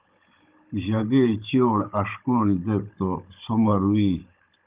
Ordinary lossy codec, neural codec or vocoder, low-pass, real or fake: Opus, 32 kbps; codec, 16 kHz, 16 kbps, FunCodec, trained on Chinese and English, 50 frames a second; 3.6 kHz; fake